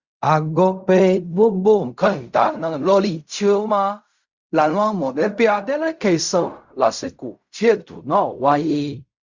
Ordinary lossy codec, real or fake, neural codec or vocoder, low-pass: Opus, 64 kbps; fake; codec, 16 kHz in and 24 kHz out, 0.4 kbps, LongCat-Audio-Codec, fine tuned four codebook decoder; 7.2 kHz